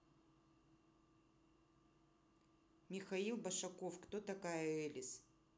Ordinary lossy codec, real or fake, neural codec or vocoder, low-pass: none; real; none; none